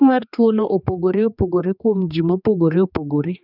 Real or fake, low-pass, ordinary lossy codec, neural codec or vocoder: fake; 5.4 kHz; none; codec, 16 kHz, 2 kbps, X-Codec, HuBERT features, trained on general audio